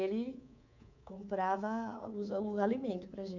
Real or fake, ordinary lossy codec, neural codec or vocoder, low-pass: fake; none; codec, 24 kHz, 3.1 kbps, DualCodec; 7.2 kHz